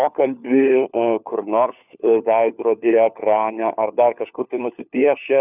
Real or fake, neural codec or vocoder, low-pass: fake; codec, 16 kHz, 4 kbps, FunCodec, trained on LibriTTS, 50 frames a second; 3.6 kHz